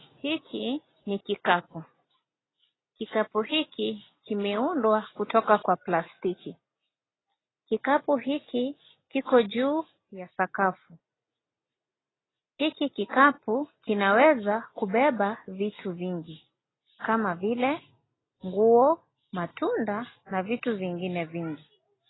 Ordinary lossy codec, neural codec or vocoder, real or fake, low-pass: AAC, 16 kbps; none; real; 7.2 kHz